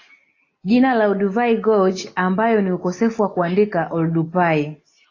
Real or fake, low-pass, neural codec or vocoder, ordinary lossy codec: real; 7.2 kHz; none; AAC, 32 kbps